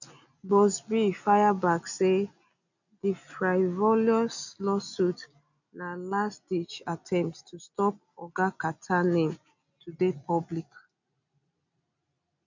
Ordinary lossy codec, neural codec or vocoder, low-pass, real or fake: none; none; 7.2 kHz; real